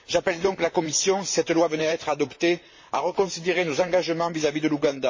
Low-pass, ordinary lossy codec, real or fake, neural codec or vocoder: 7.2 kHz; MP3, 32 kbps; fake; vocoder, 44.1 kHz, 128 mel bands, Pupu-Vocoder